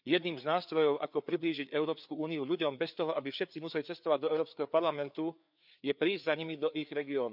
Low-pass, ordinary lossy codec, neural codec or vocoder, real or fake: 5.4 kHz; none; codec, 16 kHz, 4 kbps, FreqCodec, larger model; fake